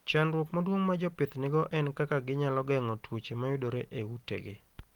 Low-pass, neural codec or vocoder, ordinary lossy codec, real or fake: 19.8 kHz; none; Opus, 24 kbps; real